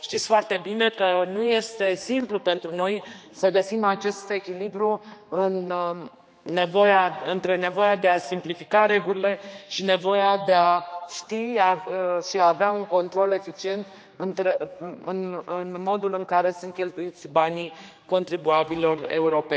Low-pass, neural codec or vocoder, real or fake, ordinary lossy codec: none; codec, 16 kHz, 2 kbps, X-Codec, HuBERT features, trained on general audio; fake; none